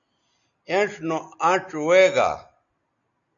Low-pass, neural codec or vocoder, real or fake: 7.2 kHz; none; real